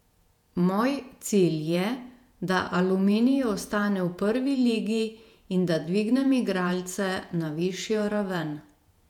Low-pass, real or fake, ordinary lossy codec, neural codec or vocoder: 19.8 kHz; fake; none; vocoder, 48 kHz, 128 mel bands, Vocos